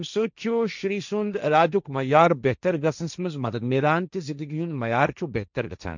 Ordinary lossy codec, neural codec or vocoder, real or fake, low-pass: none; codec, 16 kHz, 1.1 kbps, Voila-Tokenizer; fake; 7.2 kHz